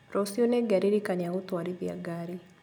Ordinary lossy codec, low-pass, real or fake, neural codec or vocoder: none; none; real; none